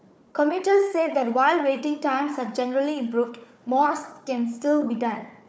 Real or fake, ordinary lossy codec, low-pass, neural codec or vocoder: fake; none; none; codec, 16 kHz, 4 kbps, FunCodec, trained on Chinese and English, 50 frames a second